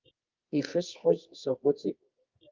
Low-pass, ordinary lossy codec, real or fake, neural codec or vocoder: 7.2 kHz; Opus, 24 kbps; fake; codec, 24 kHz, 0.9 kbps, WavTokenizer, medium music audio release